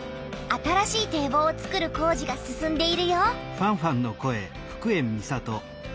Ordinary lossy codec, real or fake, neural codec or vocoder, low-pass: none; real; none; none